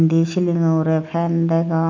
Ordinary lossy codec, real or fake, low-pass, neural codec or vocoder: none; real; 7.2 kHz; none